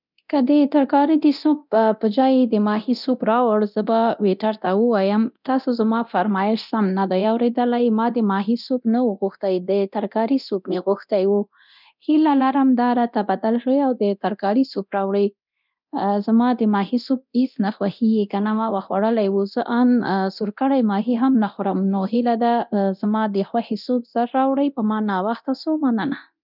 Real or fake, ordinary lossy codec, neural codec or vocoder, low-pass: fake; none; codec, 24 kHz, 0.9 kbps, DualCodec; 5.4 kHz